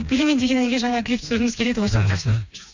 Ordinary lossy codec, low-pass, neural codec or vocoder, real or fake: MP3, 64 kbps; 7.2 kHz; codec, 16 kHz, 2 kbps, FreqCodec, smaller model; fake